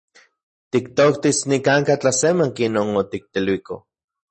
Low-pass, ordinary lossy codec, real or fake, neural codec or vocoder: 10.8 kHz; MP3, 32 kbps; real; none